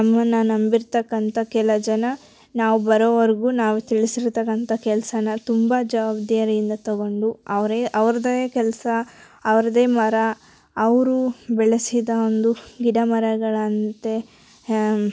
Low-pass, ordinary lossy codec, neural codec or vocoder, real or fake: none; none; none; real